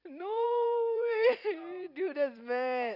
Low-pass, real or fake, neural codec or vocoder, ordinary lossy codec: 5.4 kHz; fake; vocoder, 44.1 kHz, 128 mel bands every 256 samples, BigVGAN v2; none